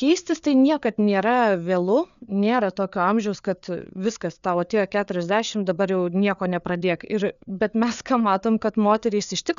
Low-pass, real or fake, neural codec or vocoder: 7.2 kHz; fake; codec, 16 kHz, 4 kbps, FreqCodec, larger model